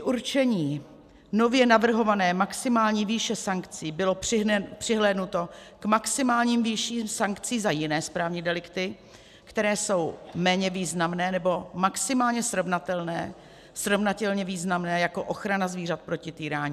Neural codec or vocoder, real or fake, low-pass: none; real; 14.4 kHz